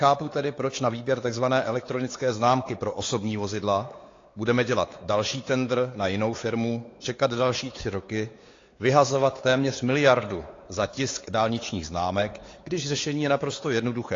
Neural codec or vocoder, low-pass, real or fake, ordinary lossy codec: codec, 16 kHz, 4 kbps, X-Codec, WavLM features, trained on Multilingual LibriSpeech; 7.2 kHz; fake; AAC, 32 kbps